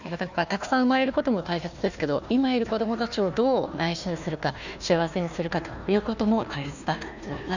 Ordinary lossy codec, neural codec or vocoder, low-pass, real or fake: none; codec, 16 kHz, 1 kbps, FunCodec, trained on Chinese and English, 50 frames a second; 7.2 kHz; fake